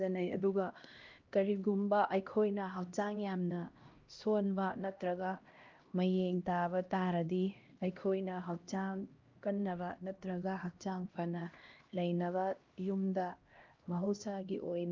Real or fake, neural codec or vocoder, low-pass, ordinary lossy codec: fake; codec, 16 kHz, 1 kbps, X-Codec, HuBERT features, trained on LibriSpeech; 7.2 kHz; Opus, 32 kbps